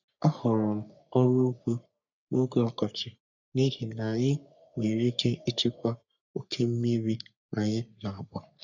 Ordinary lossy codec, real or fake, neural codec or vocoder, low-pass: none; fake; codec, 44.1 kHz, 3.4 kbps, Pupu-Codec; 7.2 kHz